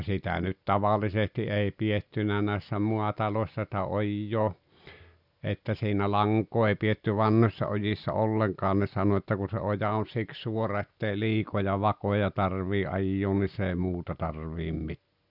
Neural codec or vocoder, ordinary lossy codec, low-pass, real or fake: none; none; 5.4 kHz; real